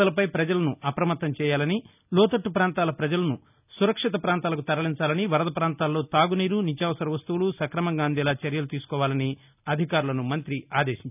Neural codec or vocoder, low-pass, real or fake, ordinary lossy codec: none; 3.6 kHz; real; none